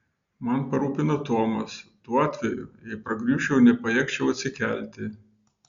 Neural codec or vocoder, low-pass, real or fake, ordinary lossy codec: none; 7.2 kHz; real; Opus, 64 kbps